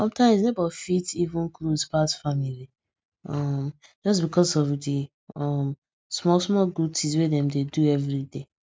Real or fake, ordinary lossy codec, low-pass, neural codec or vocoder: real; none; none; none